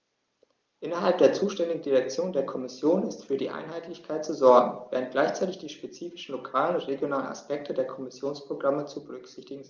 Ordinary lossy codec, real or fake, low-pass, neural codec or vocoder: Opus, 24 kbps; real; 7.2 kHz; none